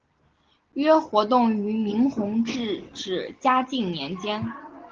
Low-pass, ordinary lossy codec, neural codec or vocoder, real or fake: 7.2 kHz; Opus, 16 kbps; none; real